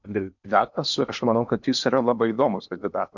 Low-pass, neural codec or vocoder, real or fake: 7.2 kHz; codec, 16 kHz in and 24 kHz out, 0.8 kbps, FocalCodec, streaming, 65536 codes; fake